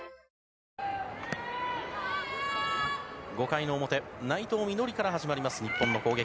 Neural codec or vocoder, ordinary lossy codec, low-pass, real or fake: none; none; none; real